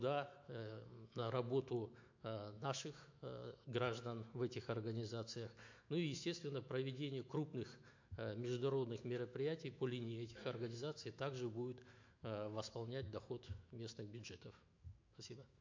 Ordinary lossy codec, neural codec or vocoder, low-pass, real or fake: MP3, 48 kbps; autoencoder, 48 kHz, 128 numbers a frame, DAC-VAE, trained on Japanese speech; 7.2 kHz; fake